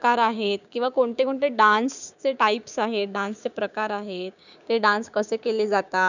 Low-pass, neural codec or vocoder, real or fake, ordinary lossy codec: 7.2 kHz; codec, 16 kHz, 6 kbps, DAC; fake; none